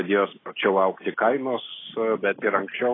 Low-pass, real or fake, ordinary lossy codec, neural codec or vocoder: 7.2 kHz; real; AAC, 16 kbps; none